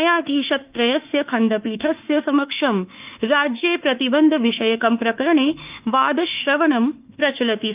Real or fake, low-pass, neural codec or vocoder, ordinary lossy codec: fake; 3.6 kHz; autoencoder, 48 kHz, 32 numbers a frame, DAC-VAE, trained on Japanese speech; Opus, 24 kbps